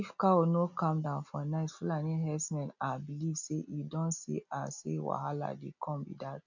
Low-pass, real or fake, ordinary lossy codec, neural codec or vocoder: 7.2 kHz; real; none; none